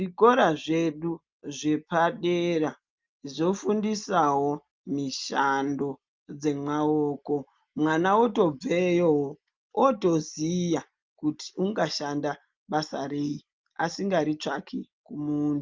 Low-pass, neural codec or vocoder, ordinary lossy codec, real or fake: 7.2 kHz; none; Opus, 24 kbps; real